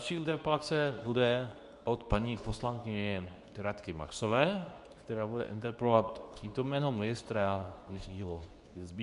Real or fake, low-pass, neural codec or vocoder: fake; 10.8 kHz; codec, 24 kHz, 0.9 kbps, WavTokenizer, medium speech release version 2